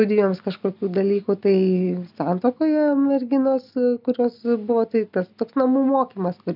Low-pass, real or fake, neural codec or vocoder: 5.4 kHz; real; none